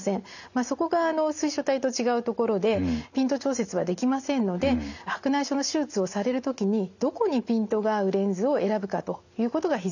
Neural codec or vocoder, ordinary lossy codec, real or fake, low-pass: none; none; real; 7.2 kHz